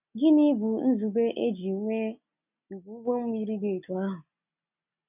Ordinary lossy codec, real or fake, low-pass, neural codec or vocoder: none; real; 3.6 kHz; none